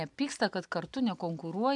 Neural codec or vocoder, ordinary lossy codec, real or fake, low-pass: none; AAC, 64 kbps; real; 10.8 kHz